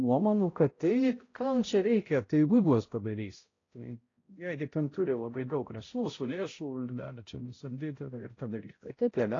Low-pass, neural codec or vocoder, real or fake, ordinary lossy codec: 7.2 kHz; codec, 16 kHz, 0.5 kbps, X-Codec, HuBERT features, trained on balanced general audio; fake; AAC, 32 kbps